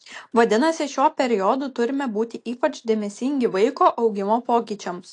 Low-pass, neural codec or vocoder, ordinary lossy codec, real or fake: 9.9 kHz; none; AAC, 48 kbps; real